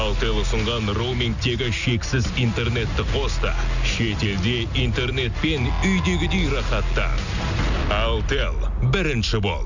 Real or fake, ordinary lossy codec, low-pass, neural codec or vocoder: real; none; 7.2 kHz; none